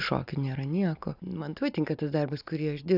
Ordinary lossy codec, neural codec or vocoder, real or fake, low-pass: AAC, 48 kbps; none; real; 5.4 kHz